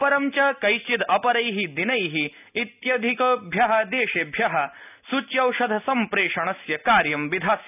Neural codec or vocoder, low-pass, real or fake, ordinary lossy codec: none; 3.6 kHz; real; none